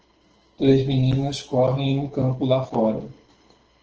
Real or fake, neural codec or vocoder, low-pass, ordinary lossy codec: fake; codec, 24 kHz, 6 kbps, HILCodec; 7.2 kHz; Opus, 16 kbps